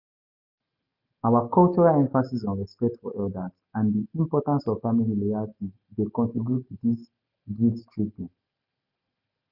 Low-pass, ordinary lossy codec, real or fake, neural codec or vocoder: 5.4 kHz; none; real; none